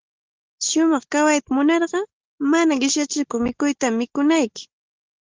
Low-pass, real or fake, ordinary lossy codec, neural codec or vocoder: 7.2 kHz; real; Opus, 16 kbps; none